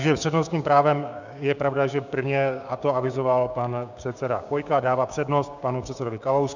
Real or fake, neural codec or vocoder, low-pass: fake; codec, 16 kHz, 6 kbps, DAC; 7.2 kHz